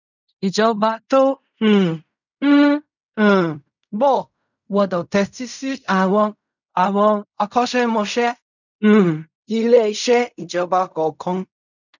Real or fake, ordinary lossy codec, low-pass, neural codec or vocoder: fake; none; 7.2 kHz; codec, 16 kHz in and 24 kHz out, 0.4 kbps, LongCat-Audio-Codec, fine tuned four codebook decoder